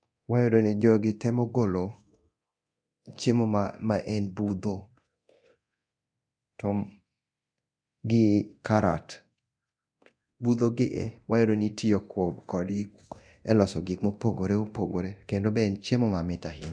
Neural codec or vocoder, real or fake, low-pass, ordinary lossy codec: codec, 24 kHz, 0.9 kbps, DualCodec; fake; 9.9 kHz; none